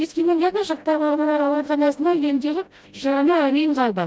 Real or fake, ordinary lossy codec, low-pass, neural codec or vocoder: fake; none; none; codec, 16 kHz, 0.5 kbps, FreqCodec, smaller model